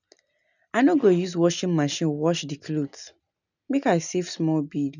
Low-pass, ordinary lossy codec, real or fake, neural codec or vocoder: 7.2 kHz; none; real; none